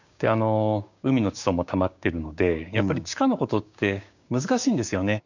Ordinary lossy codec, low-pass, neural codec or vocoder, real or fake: none; 7.2 kHz; codec, 16 kHz, 6 kbps, DAC; fake